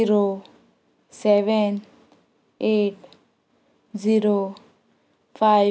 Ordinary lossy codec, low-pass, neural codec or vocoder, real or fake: none; none; none; real